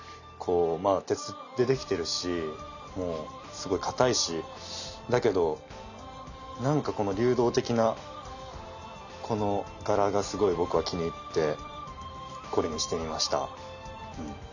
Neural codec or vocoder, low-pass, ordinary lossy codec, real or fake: none; 7.2 kHz; none; real